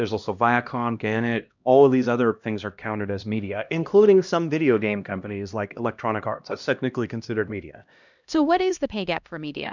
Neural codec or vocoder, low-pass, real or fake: codec, 16 kHz, 1 kbps, X-Codec, HuBERT features, trained on LibriSpeech; 7.2 kHz; fake